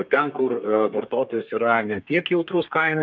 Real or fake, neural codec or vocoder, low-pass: fake; codec, 32 kHz, 1.9 kbps, SNAC; 7.2 kHz